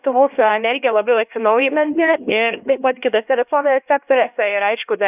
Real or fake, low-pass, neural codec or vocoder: fake; 3.6 kHz; codec, 16 kHz, 1 kbps, X-Codec, HuBERT features, trained on LibriSpeech